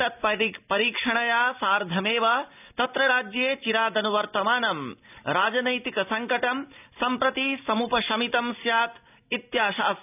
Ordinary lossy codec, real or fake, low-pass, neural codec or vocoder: none; real; 3.6 kHz; none